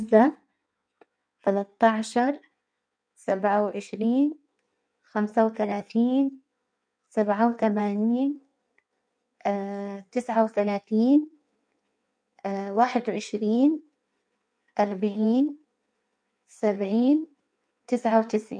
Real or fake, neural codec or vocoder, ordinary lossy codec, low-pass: fake; codec, 16 kHz in and 24 kHz out, 1.1 kbps, FireRedTTS-2 codec; none; 9.9 kHz